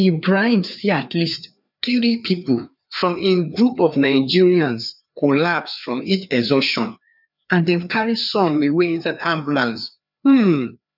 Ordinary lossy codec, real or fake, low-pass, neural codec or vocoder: none; fake; 5.4 kHz; codec, 16 kHz, 2 kbps, FreqCodec, larger model